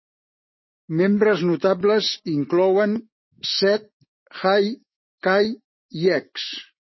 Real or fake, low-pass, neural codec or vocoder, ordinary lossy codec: fake; 7.2 kHz; autoencoder, 48 kHz, 128 numbers a frame, DAC-VAE, trained on Japanese speech; MP3, 24 kbps